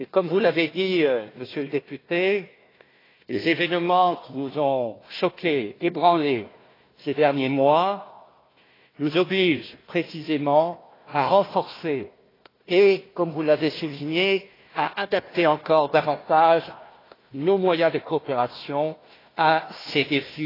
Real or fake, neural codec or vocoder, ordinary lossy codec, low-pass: fake; codec, 16 kHz, 1 kbps, FunCodec, trained on Chinese and English, 50 frames a second; AAC, 24 kbps; 5.4 kHz